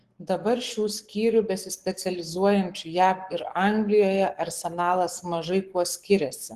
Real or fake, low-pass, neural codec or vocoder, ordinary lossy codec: fake; 14.4 kHz; codec, 44.1 kHz, 7.8 kbps, Pupu-Codec; Opus, 16 kbps